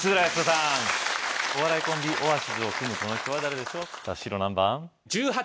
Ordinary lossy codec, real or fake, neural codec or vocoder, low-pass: none; real; none; none